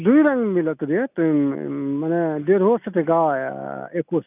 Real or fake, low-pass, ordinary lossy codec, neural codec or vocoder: real; 3.6 kHz; none; none